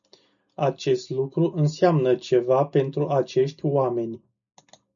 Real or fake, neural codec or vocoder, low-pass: real; none; 7.2 kHz